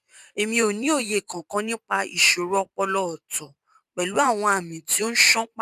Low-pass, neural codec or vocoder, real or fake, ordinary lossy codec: 14.4 kHz; vocoder, 44.1 kHz, 128 mel bands every 512 samples, BigVGAN v2; fake; none